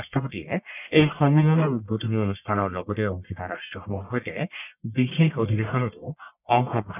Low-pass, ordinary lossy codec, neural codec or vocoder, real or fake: 3.6 kHz; none; codec, 44.1 kHz, 1.7 kbps, Pupu-Codec; fake